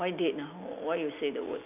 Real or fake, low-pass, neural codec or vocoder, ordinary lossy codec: real; 3.6 kHz; none; none